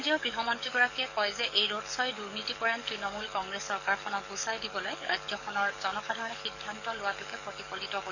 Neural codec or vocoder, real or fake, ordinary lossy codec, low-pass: codec, 44.1 kHz, 7.8 kbps, DAC; fake; none; 7.2 kHz